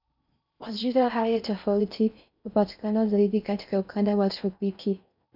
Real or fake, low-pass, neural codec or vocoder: fake; 5.4 kHz; codec, 16 kHz in and 24 kHz out, 0.6 kbps, FocalCodec, streaming, 4096 codes